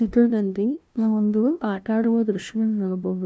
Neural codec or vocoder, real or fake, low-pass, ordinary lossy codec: codec, 16 kHz, 0.5 kbps, FunCodec, trained on LibriTTS, 25 frames a second; fake; none; none